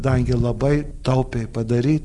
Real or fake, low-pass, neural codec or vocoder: real; 10.8 kHz; none